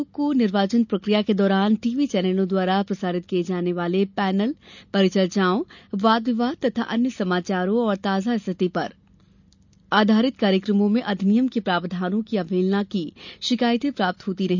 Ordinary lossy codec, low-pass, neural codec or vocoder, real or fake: none; 7.2 kHz; none; real